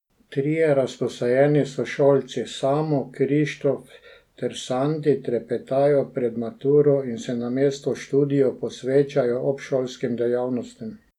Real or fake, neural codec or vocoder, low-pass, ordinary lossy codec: real; none; 19.8 kHz; none